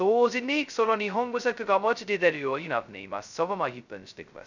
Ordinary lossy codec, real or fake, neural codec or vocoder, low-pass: none; fake; codec, 16 kHz, 0.2 kbps, FocalCodec; 7.2 kHz